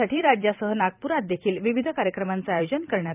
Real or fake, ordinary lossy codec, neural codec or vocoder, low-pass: fake; none; vocoder, 44.1 kHz, 128 mel bands every 256 samples, BigVGAN v2; 3.6 kHz